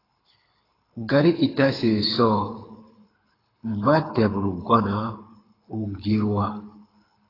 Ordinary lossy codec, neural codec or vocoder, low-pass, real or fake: AAC, 24 kbps; codec, 24 kHz, 6 kbps, HILCodec; 5.4 kHz; fake